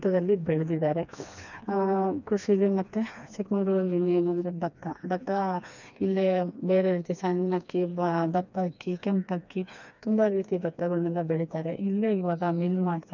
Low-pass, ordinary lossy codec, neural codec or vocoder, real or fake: 7.2 kHz; none; codec, 16 kHz, 2 kbps, FreqCodec, smaller model; fake